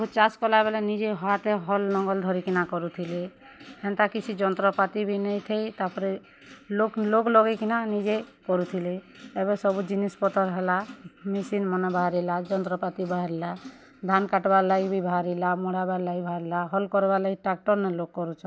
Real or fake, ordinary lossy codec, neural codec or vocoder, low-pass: real; none; none; none